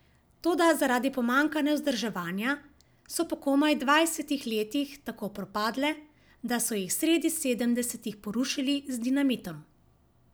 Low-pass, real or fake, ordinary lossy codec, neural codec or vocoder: none; real; none; none